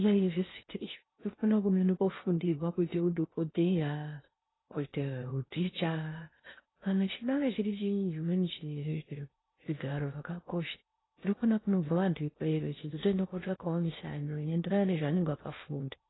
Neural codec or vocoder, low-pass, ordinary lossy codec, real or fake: codec, 16 kHz in and 24 kHz out, 0.6 kbps, FocalCodec, streaming, 2048 codes; 7.2 kHz; AAC, 16 kbps; fake